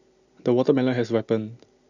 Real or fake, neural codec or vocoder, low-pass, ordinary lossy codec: real; none; 7.2 kHz; none